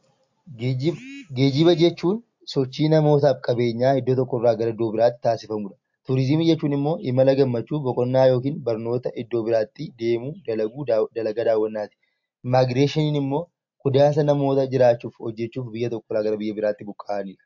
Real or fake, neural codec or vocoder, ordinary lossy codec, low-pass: real; none; MP3, 48 kbps; 7.2 kHz